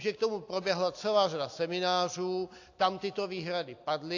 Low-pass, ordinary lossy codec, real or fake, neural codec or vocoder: 7.2 kHz; AAC, 48 kbps; real; none